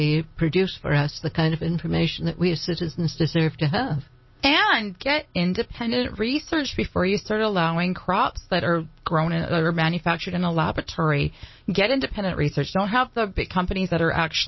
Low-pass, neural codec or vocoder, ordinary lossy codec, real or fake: 7.2 kHz; none; MP3, 24 kbps; real